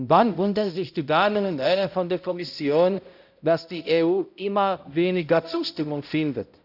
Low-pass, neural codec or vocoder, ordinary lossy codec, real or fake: 5.4 kHz; codec, 16 kHz, 0.5 kbps, X-Codec, HuBERT features, trained on balanced general audio; none; fake